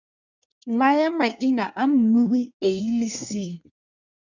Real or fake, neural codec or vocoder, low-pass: fake; codec, 24 kHz, 1 kbps, SNAC; 7.2 kHz